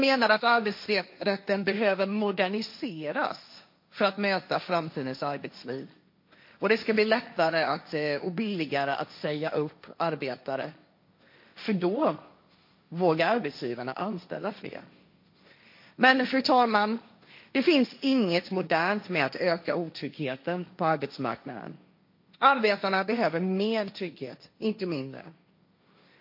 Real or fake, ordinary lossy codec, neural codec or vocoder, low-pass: fake; MP3, 32 kbps; codec, 16 kHz, 1.1 kbps, Voila-Tokenizer; 5.4 kHz